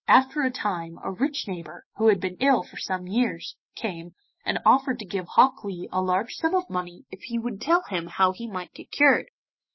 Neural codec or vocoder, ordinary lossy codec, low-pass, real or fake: autoencoder, 48 kHz, 128 numbers a frame, DAC-VAE, trained on Japanese speech; MP3, 24 kbps; 7.2 kHz; fake